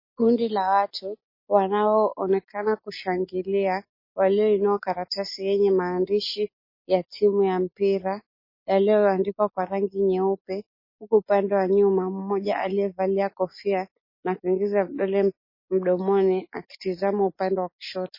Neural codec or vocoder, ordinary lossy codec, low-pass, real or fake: none; MP3, 24 kbps; 5.4 kHz; real